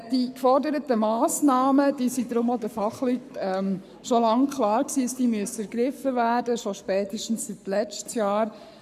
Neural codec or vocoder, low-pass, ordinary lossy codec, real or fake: codec, 44.1 kHz, 7.8 kbps, Pupu-Codec; 14.4 kHz; none; fake